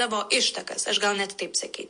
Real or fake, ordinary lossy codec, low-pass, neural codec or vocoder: real; MP3, 48 kbps; 9.9 kHz; none